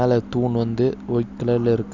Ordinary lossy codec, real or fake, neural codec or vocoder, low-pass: none; real; none; 7.2 kHz